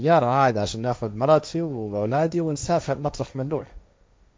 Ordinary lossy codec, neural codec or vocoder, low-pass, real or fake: none; codec, 16 kHz, 1.1 kbps, Voila-Tokenizer; none; fake